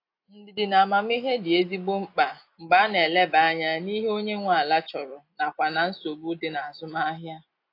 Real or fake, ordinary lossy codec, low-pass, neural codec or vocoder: real; AAC, 32 kbps; 5.4 kHz; none